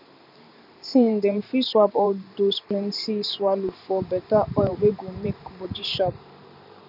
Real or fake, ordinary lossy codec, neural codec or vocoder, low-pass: fake; none; vocoder, 44.1 kHz, 128 mel bands every 512 samples, BigVGAN v2; 5.4 kHz